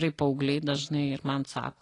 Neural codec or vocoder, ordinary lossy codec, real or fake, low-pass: none; AAC, 32 kbps; real; 10.8 kHz